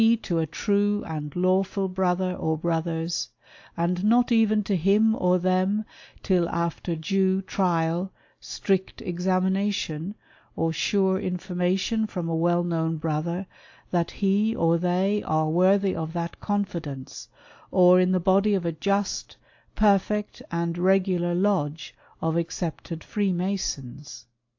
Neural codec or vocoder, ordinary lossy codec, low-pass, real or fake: none; AAC, 48 kbps; 7.2 kHz; real